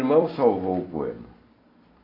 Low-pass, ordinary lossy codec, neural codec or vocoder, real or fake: 5.4 kHz; AAC, 24 kbps; vocoder, 44.1 kHz, 128 mel bands every 256 samples, BigVGAN v2; fake